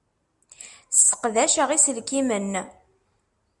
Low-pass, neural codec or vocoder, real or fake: 10.8 kHz; none; real